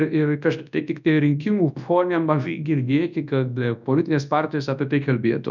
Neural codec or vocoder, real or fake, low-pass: codec, 24 kHz, 0.9 kbps, WavTokenizer, large speech release; fake; 7.2 kHz